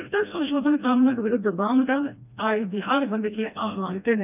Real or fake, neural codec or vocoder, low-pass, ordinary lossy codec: fake; codec, 16 kHz, 1 kbps, FreqCodec, smaller model; 3.6 kHz; none